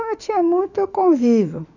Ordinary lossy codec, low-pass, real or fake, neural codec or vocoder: none; 7.2 kHz; fake; autoencoder, 48 kHz, 32 numbers a frame, DAC-VAE, trained on Japanese speech